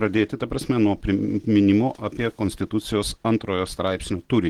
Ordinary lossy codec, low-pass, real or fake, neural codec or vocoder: Opus, 16 kbps; 19.8 kHz; real; none